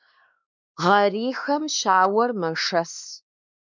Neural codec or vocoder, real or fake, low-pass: codec, 16 kHz, 2 kbps, X-Codec, WavLM features, trained on Multilingual LibriSpeech; fake; 7.2 kHz